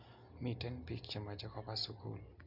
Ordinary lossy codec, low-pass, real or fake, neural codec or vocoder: none; 5.4 kHz; real; none